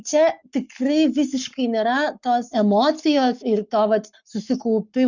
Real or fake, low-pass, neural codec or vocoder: fake; 7.2 kHz; codec, 44.1 kHz, 7.8 kbps, Pupu-Codec